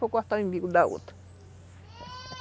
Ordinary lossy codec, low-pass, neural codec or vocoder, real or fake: none; none; none; real